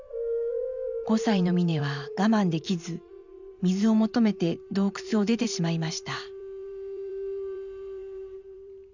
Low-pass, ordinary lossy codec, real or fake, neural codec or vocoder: 7.2 kHz; none; fake; vocoder, 44.1 kHz, 128 mel bands every 512 samples, BigVGAN v2